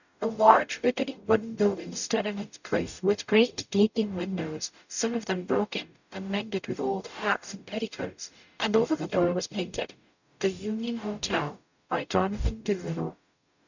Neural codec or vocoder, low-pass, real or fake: codec, 44.1 kHz, 0.9 kbps, DAC; 7.2 kHz; fake